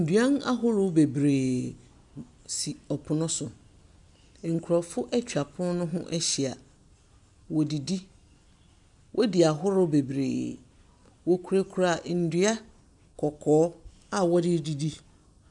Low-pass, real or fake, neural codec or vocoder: 10.8 kHz; real; none